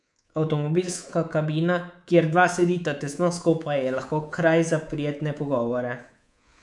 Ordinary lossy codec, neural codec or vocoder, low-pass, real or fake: none; codec, 24 kHz, 3.1 kbps, DualCodec; 10.8 kHz; fake